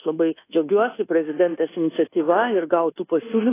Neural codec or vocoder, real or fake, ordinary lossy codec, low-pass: codec, 24 kHz, 1.2 kbps, DualCodec; fake; AAC, 16 kbps; 3.6 kHz